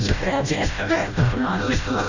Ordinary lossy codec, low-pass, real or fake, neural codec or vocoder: Opus, 64 kbps; 7.2 kHz; fake; codec, 16 kHz, 0.5 kbps, FreqCodec, smaller model